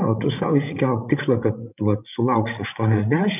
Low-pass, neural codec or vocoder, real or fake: 3.6 kHz; codec, 16 kHz, 16 kbps, FreqCodec, larger model; fake